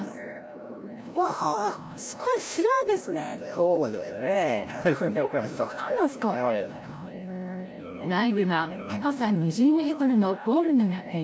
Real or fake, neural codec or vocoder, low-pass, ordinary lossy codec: fake; codec, 16 kHz, 0.5 kbps, FreqCodec, larger model; none; none